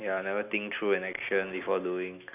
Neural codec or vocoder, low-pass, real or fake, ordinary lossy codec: none; 3.6 kHz; real; none